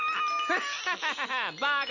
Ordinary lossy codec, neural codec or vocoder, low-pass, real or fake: none; none; 7.2 kHz; real